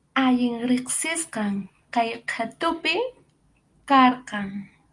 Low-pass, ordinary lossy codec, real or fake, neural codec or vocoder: 10.8 kHz; Opus, 32 kbps; real; none